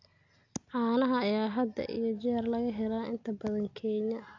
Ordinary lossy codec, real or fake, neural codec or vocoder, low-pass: AAC, 48 kbps; real; none; 7.2 kHz